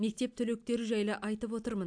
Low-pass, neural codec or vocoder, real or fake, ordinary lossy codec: 9.9 kHz; none; real; AAC, 64 kbps